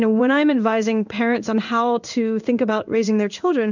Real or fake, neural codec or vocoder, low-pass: fake; codec, 16 kHz in and 24 kHz out, 1 kbps, XY-Tokenizer; 7.2 kHz